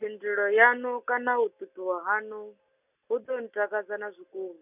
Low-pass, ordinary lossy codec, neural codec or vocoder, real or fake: 3.6 kHz; none; none; real